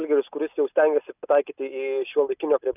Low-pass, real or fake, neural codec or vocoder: 3.6 kHz; real; none